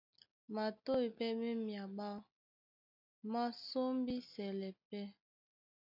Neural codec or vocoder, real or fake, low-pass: none; real; 5.4 kHz